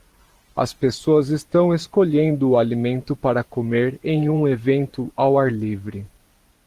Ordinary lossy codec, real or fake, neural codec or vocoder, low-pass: Opus, 24 kbps; fake; vocoder, 44.1 kHz, 128 mel bands every 512 samples, BigVGAN v2; 14.4 kHz